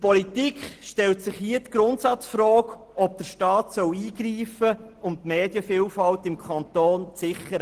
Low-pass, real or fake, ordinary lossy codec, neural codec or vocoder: 14.4 kHz; real; Opus, 16 kbps; none